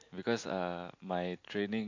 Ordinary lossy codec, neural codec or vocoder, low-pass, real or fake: AAC, 48 kbps; none; 7.2 kHz; real